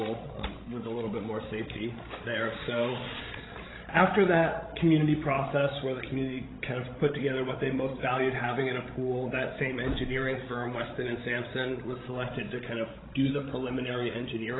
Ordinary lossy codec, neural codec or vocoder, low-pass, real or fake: AAC, 16 kbps; codec, 16 kHz, 16 kbps, FreqCodec, larger model; 7.2 kHz; fake